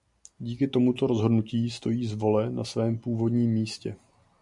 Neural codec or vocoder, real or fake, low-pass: none; real; 10.8 kHz